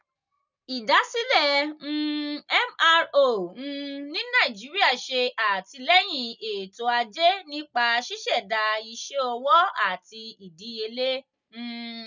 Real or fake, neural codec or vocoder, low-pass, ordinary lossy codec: real; none; 7.2 kHz; none